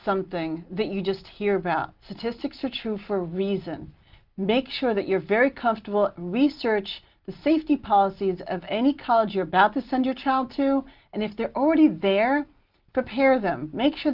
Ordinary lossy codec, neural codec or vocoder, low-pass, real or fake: Opus, 32 kbps; none; 5.4 kHz; real